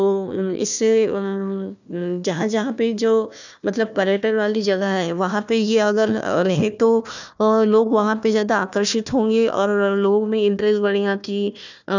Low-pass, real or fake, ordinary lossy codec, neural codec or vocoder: 7.2 kHz; fake; none; codec, 16 kHz, 1 kbps, FunCodec, trained on Chinese and English, 50 frames a second